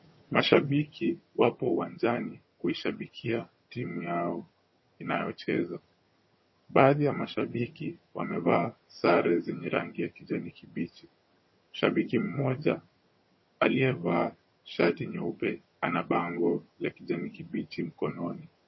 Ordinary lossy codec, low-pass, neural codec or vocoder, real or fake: MP3, 24 kbps; 7.2 kHz; vocoder, 22.05 kHz, 80 mel bands, HiFi-GAN; fake